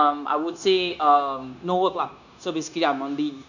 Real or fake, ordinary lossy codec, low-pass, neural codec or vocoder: fake; none; 7.2 kHz; codec, 16 kHz, 0.9 kbps, LongCat-Audio-Codec